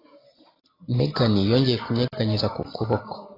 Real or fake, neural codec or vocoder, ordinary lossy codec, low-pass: real; none; AAC, 24 kbps; 5.4 kHz